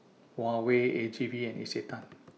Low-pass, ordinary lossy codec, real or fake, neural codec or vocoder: none; none; real; none